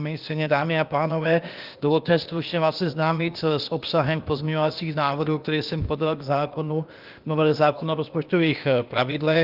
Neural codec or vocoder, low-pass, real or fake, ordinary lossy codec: codec, 16 kHz, 0.8 kbps, ZipCodec; 5.4 kHz; fake; Opus, 32 kbps